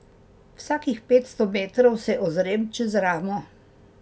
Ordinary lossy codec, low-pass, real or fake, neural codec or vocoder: none; none; real; none